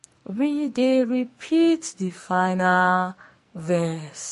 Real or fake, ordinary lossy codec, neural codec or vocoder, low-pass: fake; MP3, 48 kbps; codec, 44.1 kHz, 2.6 kbps, SNAC; 14.4 kHz